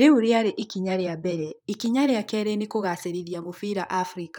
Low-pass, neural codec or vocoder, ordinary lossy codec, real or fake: 19.8 kHz; vocoder, 44.1 kHz, 128 mel bands, Pupu-Vocoder; none; fake